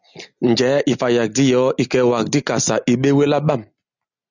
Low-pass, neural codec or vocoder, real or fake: 7.2 kHz; none; real